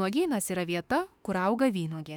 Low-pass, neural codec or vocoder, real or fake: 19.8 kHz; autoencoder, 48 kHz, 32 numbers a frame, DAC-VAE, trained on Japanese speech; fake